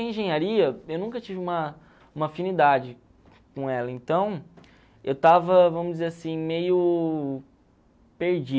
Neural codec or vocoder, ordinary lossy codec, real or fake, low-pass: none; none; real; none